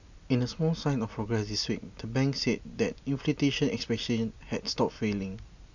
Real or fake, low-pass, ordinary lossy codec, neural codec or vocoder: real; 7.2 kHz; none; none